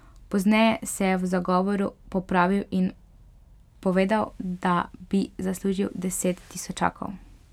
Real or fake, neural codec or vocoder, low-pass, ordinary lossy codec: real; none; 19.8 kHz; none